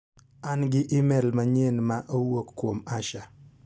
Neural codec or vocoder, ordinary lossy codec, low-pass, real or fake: none; none; none; real